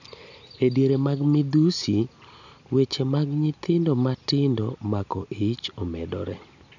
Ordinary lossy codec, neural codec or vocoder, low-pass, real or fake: none; none; 7.2 kHz; real